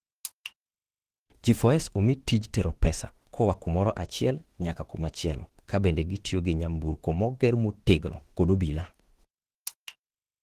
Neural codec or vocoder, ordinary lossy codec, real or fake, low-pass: autoencoder, 48 kHz, 32 numbers a frame, DAC-VAE, trained on Japanese speech; Opus, 16 kbps; fake; 14.4 kHz